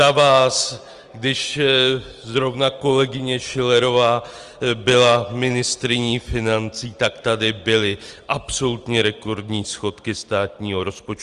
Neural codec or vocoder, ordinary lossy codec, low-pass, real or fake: none; Opus, 24 kbps; 10.8 kHz; real